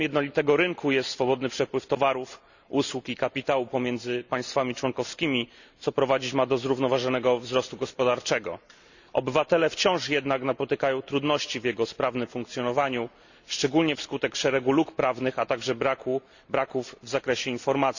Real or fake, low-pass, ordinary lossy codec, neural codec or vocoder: real; 7.2 kHz; none; none